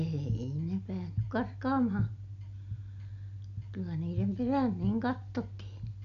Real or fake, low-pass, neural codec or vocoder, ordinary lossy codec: real; 7.2 kHz; none; none